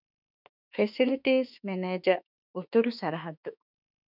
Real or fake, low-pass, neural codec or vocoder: fake; 5.4 kHz; autoencoder, 48 kHz, 32 numbers a frame, DAC-VAE, trained on Japanese speech